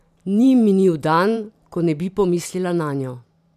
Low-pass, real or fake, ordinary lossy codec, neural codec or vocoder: 14.4 kHz; fake; none; vocoder, 44.1 kHz, 128 mel bands every 512 samples, BigVGAN v2